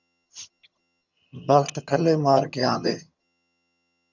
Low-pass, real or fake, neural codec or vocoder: 7.2 kHz; fake; vocoder, 22.05 kHz, 80 mel bands, HiFi-GAN